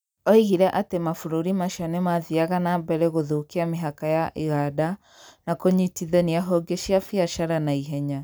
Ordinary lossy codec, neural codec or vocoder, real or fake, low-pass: none; none; real; none